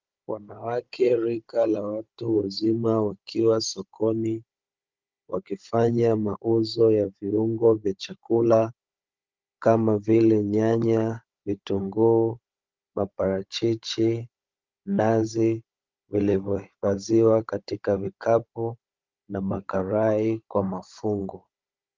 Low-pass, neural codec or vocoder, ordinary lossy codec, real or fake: 7.2 kHz; codec, 16 kHz, 16 kbps, FunCodec, trained on Chinese and English, 50 frames a second; Opus, 24 kbps; fake